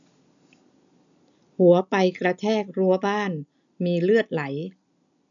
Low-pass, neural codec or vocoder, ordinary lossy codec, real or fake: 7.2 kHz; none; none; real